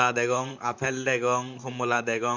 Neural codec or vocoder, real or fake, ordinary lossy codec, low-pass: vocoder, 44.1 kHz, 128 mel bands, Pupu-Vocoder; fake; none; 7.2 kHz